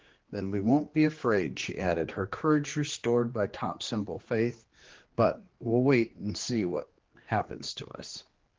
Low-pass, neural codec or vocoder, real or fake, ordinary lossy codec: 7.2 kHz; codec, 16 kHz, 2 kbps, X-Codec, HuBERT features, trained on general audio; fake; Opus, 16 kbps